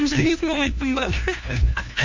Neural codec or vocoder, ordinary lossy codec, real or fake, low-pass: codec, 16 kHz, 1 kbps, FunCodec, trained on LibriTTS, 50 frames a second; MP3, 48 kbps; fake; 7.2 kHz